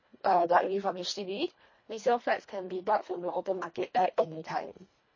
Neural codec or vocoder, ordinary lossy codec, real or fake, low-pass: codec, 24 kHz, 1.5 kbps, HILCodec; MP3, 32 kbps; fake; 7.2 kHz